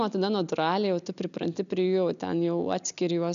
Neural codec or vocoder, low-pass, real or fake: none; 7.2 kHz; real